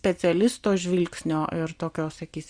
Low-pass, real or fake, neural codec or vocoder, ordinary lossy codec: 9.9 kHz; real; none; Opus, 64 kbps